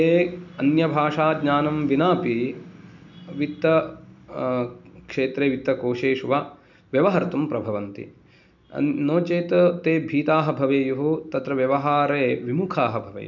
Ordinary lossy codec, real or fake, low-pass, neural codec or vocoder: none; real; 7.2 kHz; none